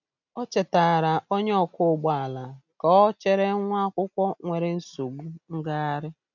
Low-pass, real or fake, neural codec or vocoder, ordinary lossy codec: 7.2 kHz; real; none; none